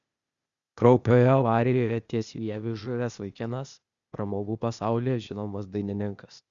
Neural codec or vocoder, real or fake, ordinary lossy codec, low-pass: codec, 16 kHz, 0.8 kbps, ZipCodec; fake; Opus, 64 kbps; 7.2 kHz